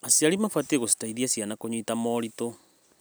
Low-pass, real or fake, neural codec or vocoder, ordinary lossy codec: none; real; none; none